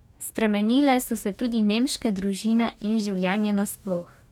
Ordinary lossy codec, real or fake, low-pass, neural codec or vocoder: none; fake; 19.8 kHz; codec, 44.1 kHz, 2.6 kbps, DAC